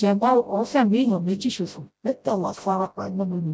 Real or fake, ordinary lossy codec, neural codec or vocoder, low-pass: fake; none; codec, 16 kHz, 0.5 kbps, FreqCodec, smaller model; none